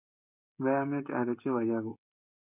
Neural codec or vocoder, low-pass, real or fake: codec, 16 kHz, 16 kbps, FreqCodec, smaller model; 3.6 kHz; fake